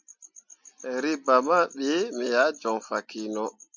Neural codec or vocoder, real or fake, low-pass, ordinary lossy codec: none; real; 7.2 kHz; MP3, 64 kbps